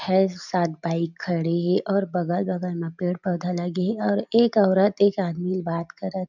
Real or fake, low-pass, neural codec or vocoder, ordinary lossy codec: real; 7.2 kHz; none; none